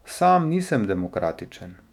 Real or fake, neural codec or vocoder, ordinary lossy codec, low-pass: fake; autoencoder, 48 kHz, 128 numbers a frame, DAC-VAE, trained on Japanese speech; none; 19.8 kHz